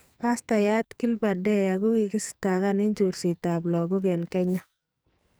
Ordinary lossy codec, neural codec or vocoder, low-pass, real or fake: none; codec, 44.1 kHz, 2.6 kbps, SNAC; none; fake